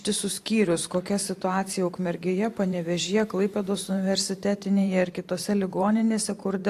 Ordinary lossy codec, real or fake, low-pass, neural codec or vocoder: AAC, 64 kbps; fake; 14.4 kHz; vocoder, 44.1 kHz, 128 mel bands every 512 samples, BigVGAN v2